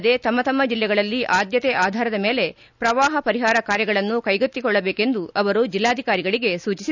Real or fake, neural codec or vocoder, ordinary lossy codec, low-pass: real; none; none; 7.2 kHz